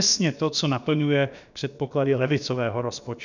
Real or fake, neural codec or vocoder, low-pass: fake; codec, 16 kHz, about 1 kbps, DyCAST, with the encoder's durations; 7.2 kHz